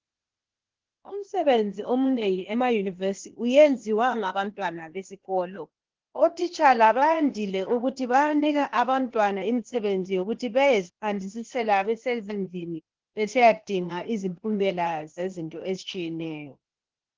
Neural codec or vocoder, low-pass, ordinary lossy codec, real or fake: codec, 16 kHz, 0.8 kbps, ZipCodec; 7.2 kHz; Opus, 16 kbps; fake